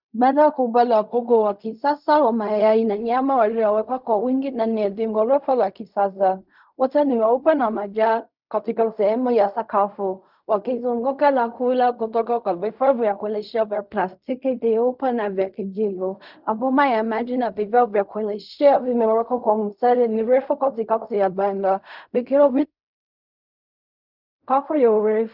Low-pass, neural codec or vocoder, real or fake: 5.4 kHz; codec, 16 kHz in and 24 kHz out, 0.4 kbps, LongCat-Audio-Codec, fine tuned four codebook decoder; fake